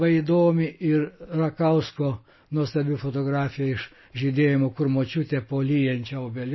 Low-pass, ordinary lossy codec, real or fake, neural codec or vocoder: 7.2 kHz; MP3, 24 kbps; real; none